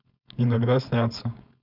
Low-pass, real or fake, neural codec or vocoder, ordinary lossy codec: 5.4 kHz; fake; codec, 16 kHz, 4.8 kbps, FACodec; none